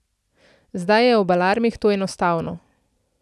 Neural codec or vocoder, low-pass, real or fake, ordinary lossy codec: none; none; real; none